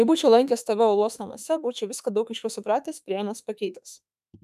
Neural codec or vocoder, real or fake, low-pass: autoencoder, 48 kHz, 32 numbers a frame, DAC-VAE, trained on Japanese speech; fake; 14.4 kHz